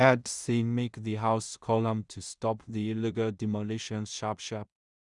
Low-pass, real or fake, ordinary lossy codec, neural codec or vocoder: 10.8 kHz; fake; Opus, 64 kbps; codec, 16 kHz in and 24 kHz out, 0.4 kbps, LongCat-Audio-Codec, two codebook decoder